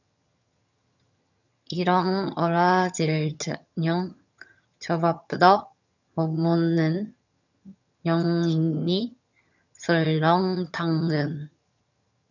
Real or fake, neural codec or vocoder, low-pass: fake; vocoder, 22.05 kHz, 80 mel bands, HiFi-GAN; 7.2 kHz